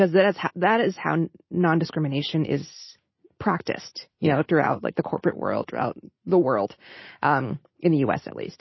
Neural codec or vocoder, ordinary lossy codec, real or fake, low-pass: none; MP3, 24 kbps; real; 7.2 kHz